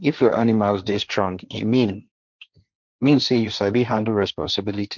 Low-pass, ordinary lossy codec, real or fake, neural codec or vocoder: 7.2 kHz; none; fake; codec, 16 kHz, 1.1 kbps, Voila-Tokenizer